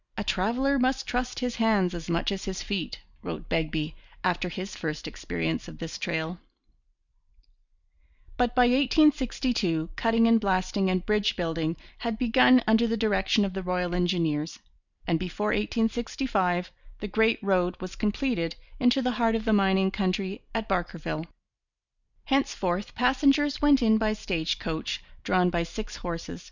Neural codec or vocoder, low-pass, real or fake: vocoder, 44.1 kHz, 128 mel bands every 256 samples, BigVGAN v2; 7.2 kHz; fake